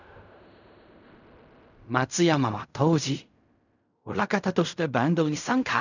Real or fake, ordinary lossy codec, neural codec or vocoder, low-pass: fake; none; codec, 16 kHz in and 24 kHz out, 0.4 kbps, LongCat-Audio-Codec, fine tuned four codebook decoder; 7.2 kHz